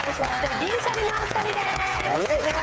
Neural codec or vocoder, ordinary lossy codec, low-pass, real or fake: codec, 16 kHz, 8 kbps, FreqCodec, smaller model; none; none; fake